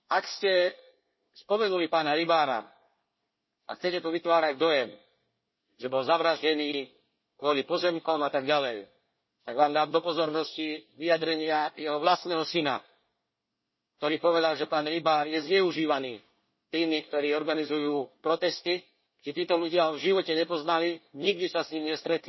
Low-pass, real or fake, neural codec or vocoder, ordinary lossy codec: 7.2 kHz; fake; codec, 24 kHz, 1 kbps, SNAC; MP3, 24 kbps